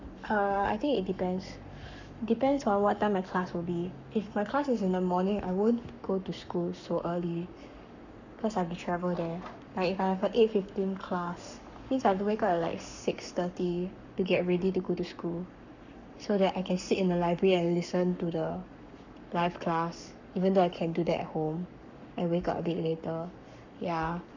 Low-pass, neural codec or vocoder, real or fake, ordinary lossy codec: 7.2 kHz; codec, 44.1 kHz, 7.8 kbps, Pupu-Codec; fake; none